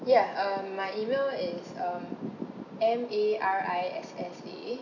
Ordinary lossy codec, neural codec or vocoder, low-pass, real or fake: none; none; 7.2 kHz; real